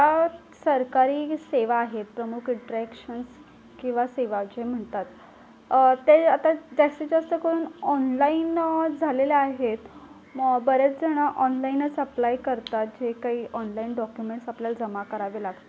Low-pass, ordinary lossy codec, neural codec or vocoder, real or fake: none; none; none; real